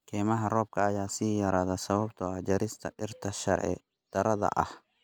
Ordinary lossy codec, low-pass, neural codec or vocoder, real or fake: none; none; none; real